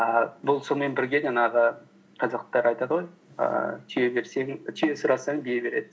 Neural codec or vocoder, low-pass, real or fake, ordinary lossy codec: none; none; real; none